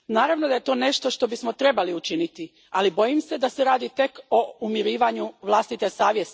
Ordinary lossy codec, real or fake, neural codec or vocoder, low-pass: none; real; none; none